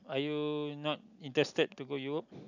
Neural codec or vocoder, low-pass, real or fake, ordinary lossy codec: none; 7.2 kHz; real; none